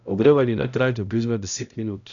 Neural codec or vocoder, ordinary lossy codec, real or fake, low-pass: codec, 16 kHz, 0.5 kbps, X-Codec, HuBERT features, trained on balanced general audio; none; fake; 7.2 kHz